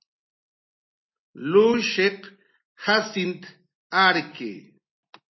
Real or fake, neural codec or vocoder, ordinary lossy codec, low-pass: real; none; MP3, 24 kbps; 7.2 kHz